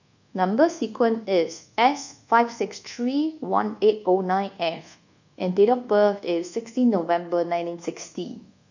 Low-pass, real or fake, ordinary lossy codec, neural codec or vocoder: 7.2 kHz; fake; none; codec, 24 kHz, 1.2 kbps, DualCodec